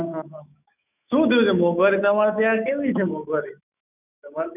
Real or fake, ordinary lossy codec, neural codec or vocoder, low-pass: fake; none; autoencoder, 48 kHz, 128 numbers a frame, DAC-VAE, trained on Japanese speech; 3.6 kHz